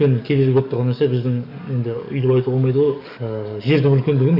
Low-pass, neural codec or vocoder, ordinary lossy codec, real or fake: 5.4 kHz; codec, 44.1 kHz, 7.8 kbps, DAC; none; fake